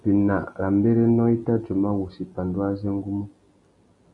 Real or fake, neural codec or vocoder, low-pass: real; none; 10.8 kHz